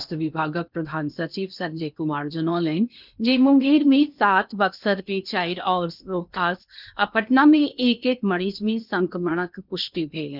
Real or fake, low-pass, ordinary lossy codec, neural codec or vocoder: fake; 5.4 kHz; none; codec, 16 kHz in and 24 kHz out, 0.8 kbps, FocalCodec, streaming, 65536 codes